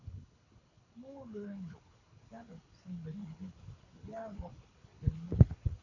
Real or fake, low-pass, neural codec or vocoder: fake; 7.2 kHz; codec, 24 kHz, 6 kbps, HILCodec